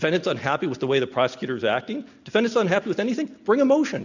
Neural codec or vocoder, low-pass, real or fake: none; 7.2 kHz; real